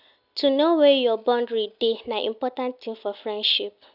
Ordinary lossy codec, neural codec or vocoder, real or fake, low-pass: none; none; real; 5.4 kHz